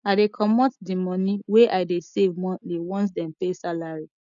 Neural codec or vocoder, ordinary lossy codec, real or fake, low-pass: none; none; real; 7.2 kHz